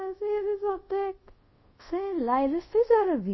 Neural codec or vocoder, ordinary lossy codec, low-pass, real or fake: codec, 24 kHz, 0.5 kbps, DualCodec; MP3, 24 kbps; 7.2 kHz; fake